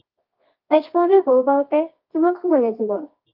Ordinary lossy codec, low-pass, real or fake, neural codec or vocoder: Opus, 24 kbps; 5.4 kHz; fake; codec, 24 kHz, 0.9 kbps, WavTokenizer, medium music audio release